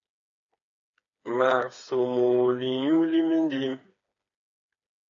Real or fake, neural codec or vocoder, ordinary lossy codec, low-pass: fake; codec, 16 kHz, 4 kbps, FreqCodec, smaller model; AAC, 64 kbps; 7.2 kHz